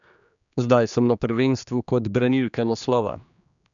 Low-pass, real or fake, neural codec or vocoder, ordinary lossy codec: 7.2 kHz; fake; codec, 16 kHz, 2 kbps, X-Codec, HuBERT features, trained on general audio; none